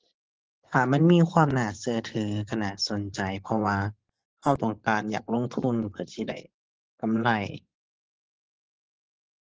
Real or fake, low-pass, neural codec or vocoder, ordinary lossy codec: fake; 7.2 kHz; vocoder, 24 kHz, 100 mel bands, Vocos; Opus, 16 kbps